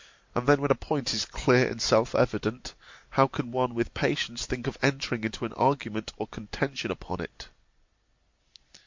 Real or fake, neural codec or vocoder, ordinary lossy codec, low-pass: real; none; MP3, 48 kbps; 7.2 kHz